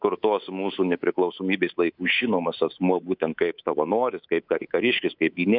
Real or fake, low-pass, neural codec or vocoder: fake; 5.4 kHz; codec, 24 kHz, 3.1 kbps, DualCodec